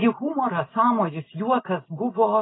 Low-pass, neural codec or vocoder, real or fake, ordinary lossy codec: 7.2 kHz; none; real; AAC, 16 kbps